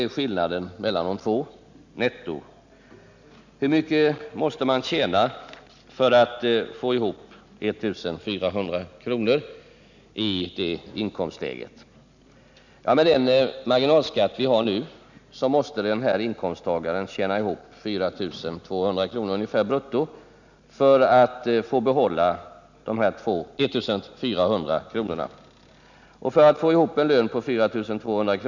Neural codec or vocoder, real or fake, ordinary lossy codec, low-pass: none; real; none; 7.2 kHz